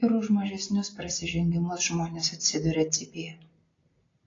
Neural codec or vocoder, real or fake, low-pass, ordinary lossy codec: none; real; 7.2 kHz; AAC, 32 kbps